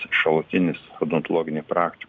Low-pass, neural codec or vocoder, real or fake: 7.2 kHz; none; real